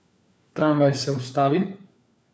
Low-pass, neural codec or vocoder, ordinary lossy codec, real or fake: none; codec, 16 kHz, 4 kbps, FunCodec, trained on LibriTTS, 50 frames a second; none; fake